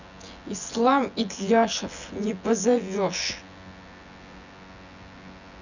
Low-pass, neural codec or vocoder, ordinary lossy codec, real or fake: 7.2 kHz; vocoder, 24 kHz, 100 mel bands, Vocos; none; fake